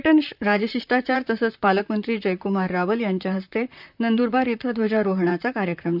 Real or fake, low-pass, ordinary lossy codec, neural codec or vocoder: fake; 5.4 kHz; none; vocoder, 44.1 kHz, 128 mel bands, Pupu-Vocoder